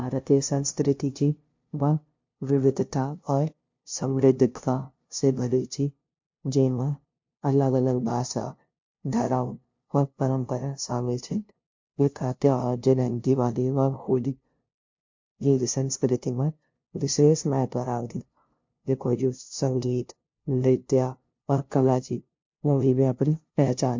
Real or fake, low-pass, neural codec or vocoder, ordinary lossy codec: fake; 7.2 kHz; codec, 16 kHz, 0.5 kbps, FunCodec, trained on LibriTTS, 25 frames a second; MP3, 48 kbps